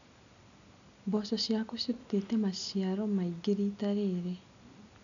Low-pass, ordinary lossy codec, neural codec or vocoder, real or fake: 7.2 kHz; none; none; real